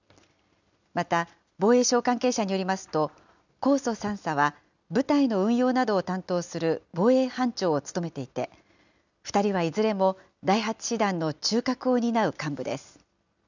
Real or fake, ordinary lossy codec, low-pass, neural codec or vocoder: real; none; 7.2 kHz; none